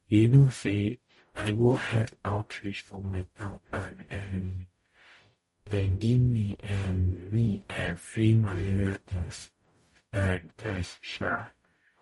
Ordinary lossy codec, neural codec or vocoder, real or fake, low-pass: MP3, 48 kbps; codec, 44.1 kHz, 0.9 kbps, DAC; fake; 19.8 kHz